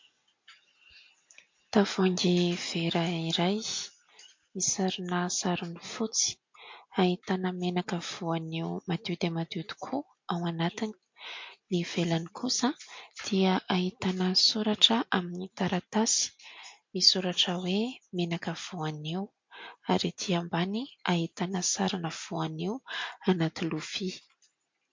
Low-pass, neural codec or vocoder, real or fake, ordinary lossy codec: 7.2 kHz; none; real; MP3, 48 kbps